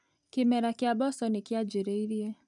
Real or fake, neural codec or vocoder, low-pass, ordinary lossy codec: real; none; 10.8 kHz; none